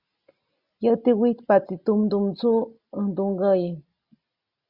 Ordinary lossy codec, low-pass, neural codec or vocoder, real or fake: Opus, 64 kbps; 5.4 kHz; none; real